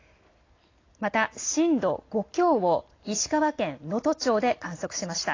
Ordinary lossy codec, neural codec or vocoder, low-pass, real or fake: AAC, 32 kbps; none; 7.2 kHz; real